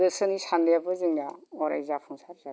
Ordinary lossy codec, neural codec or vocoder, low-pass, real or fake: none; none; none; real